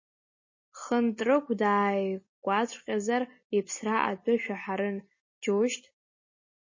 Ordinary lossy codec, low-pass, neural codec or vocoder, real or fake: MP3, 32 kbps; 7.2 kHz; none; real